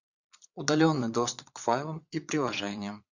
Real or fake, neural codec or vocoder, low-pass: fake; vocoder, 22.05 kHz, 80 mel bands, Vocos; 7.2 kHz